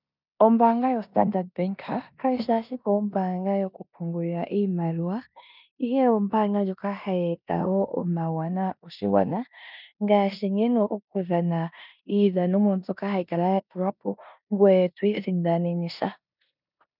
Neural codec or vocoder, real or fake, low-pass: codec, 16 kHz in and 24 kHz out, 0.9 kbps, LongCat-Audio-Codec, four codebook decoder; fake; 5.4 kHz